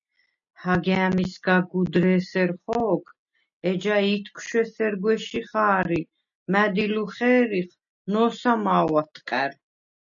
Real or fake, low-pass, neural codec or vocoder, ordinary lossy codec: real; 7.2 kHz; none; MP3, 96 kbps